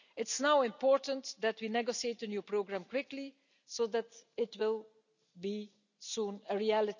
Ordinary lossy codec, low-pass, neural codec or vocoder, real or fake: none; 7.2 kHz; none; real